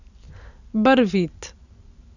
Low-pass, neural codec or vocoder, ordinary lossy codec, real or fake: 7.2 kHz; none; none; real